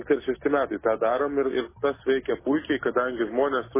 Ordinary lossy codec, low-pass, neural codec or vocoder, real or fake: MP3, 16 kbps; 3.6 kHz; none; real